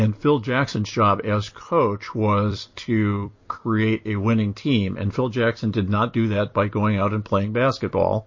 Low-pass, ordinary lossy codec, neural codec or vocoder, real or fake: 7.2 kHz; MP3, 32 kbps; vocoder, 44.1 kHz, 128 mel bands every 256 samples, BigVGAN v2; fake